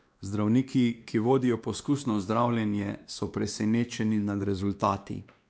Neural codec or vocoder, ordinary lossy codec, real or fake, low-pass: codec, 16 kHz, 2 kbps, X-Codec, WavLM features, trained on Multilingual LibriSpeech; none; fake; none